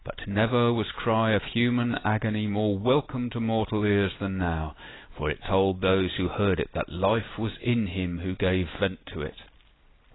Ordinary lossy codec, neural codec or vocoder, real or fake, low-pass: AAC, 16 kbps; none; real; 7.2 kHz